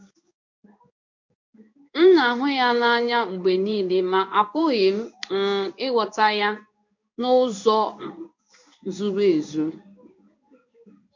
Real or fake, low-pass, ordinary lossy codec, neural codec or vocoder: fake; 7.2 kHz; MP3, 48 kbps; codec, 16 kHz in and 24 kHz out, 1 kbps, XY-Tokenizer